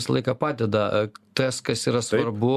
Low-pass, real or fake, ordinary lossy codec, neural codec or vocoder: 14.4 kHz; real; AAC, 96 kbps; none